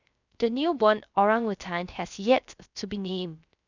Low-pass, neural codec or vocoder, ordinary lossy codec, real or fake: 7.2 kHz; codec, 16 kHz, 0.3 kbps, FocalCodec; none; fake